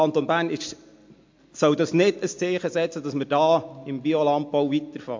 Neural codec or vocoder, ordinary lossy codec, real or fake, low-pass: none; MP3, 48 kbps; real; 7.2 kHz